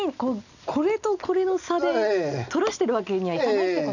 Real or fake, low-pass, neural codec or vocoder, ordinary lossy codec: real; 7.2 kHz; none; none